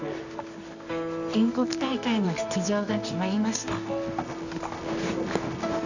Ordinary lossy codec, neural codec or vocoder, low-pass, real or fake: none; codec, 24 kHz, 0.9 kbps, WavTokenizer, medium music audio release; 7.2 kHz; fake